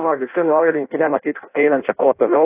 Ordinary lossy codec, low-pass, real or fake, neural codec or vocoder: AAC, 24 kbps; 3.6 kHz; fake; codec, 16 kHz in and 24 kHz out, 0.6 kbps, FireRedTTS-2 codec